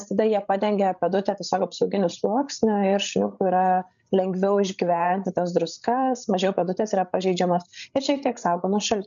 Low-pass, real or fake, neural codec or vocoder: 7.2 kHz; fake; codec, 16 kHz, 8 kbps, FreqCodec, larger model